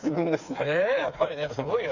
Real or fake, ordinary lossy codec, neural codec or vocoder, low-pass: fake; Opus, 64 kbps; codec, 16 kHz, 4 kbps, FreqCodec, smaller model; 7.2 kHz